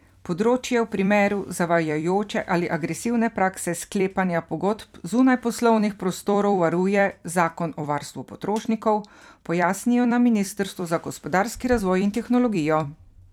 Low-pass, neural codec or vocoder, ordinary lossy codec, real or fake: 19.8 kHz; vocoder, 44.1 kHz, 128 mel bands every 256 samples, BigVGAN v2; none; fake